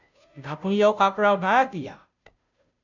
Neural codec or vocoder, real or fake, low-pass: codec, 16 kHz, 0.5 kbps, FunCodec, trained on Chinese and English, 25 frames a second; fake; 7.2 kHz